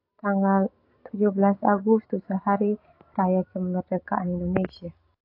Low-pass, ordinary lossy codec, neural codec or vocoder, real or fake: 5.4 kHz; AAC, 32 kbps; none; real